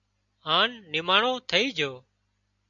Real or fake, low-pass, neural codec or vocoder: real; 7.2 kHz; none